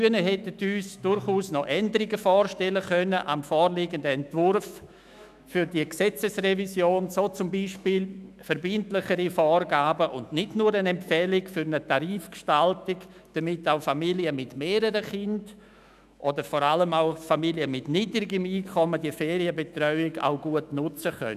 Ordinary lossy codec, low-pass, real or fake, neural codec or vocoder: none; 14.4 kHz; fake; autoencoder, 48 kHz, 128 numbers a frame, DAC-VAE, trained on Japanese speech